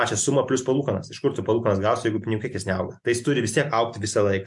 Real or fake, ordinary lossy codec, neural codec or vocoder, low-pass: real; MP3, 64 kbps; none; 10.8 kHz